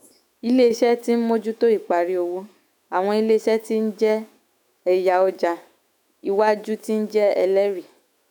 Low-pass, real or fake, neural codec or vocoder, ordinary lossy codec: none; fake; autoencoder, 48 kHz, 128 numbers a frame, DAC-VAE, trained on Japanese speech; none